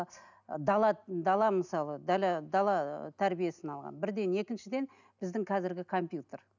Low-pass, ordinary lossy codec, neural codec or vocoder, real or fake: 7.2 kHz; none; none; real